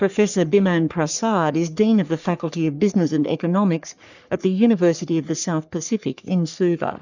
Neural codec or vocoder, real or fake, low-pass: codec, 44.1 kHz, 3.4 kbps, Pupu-Codec; fake; 7.2 kHz